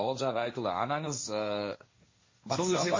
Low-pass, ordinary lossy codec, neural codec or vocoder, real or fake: 7.2 kHz; MP3, 32 kbps; codec, 16 kHz, 1.1 kbps, Voila-Tokenizer; fake